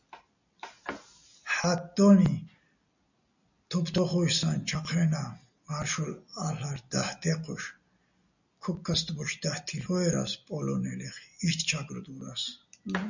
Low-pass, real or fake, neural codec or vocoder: 7.2 kHz; real; none